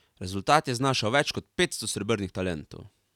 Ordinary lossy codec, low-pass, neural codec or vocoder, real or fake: none; 19.8 kHz; none; real